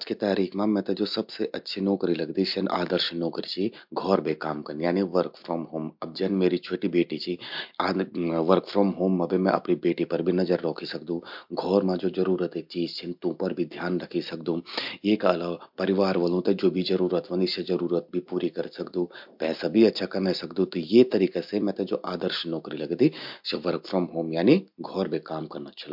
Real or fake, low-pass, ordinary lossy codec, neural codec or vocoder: real; 5.4 kHz; MP3, 48 kbps; none